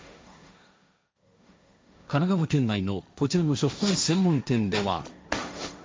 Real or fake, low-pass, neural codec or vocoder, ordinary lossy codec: fake; none; codec, 16 kHz, 1.1 kbps, Voila-Tokenizer; none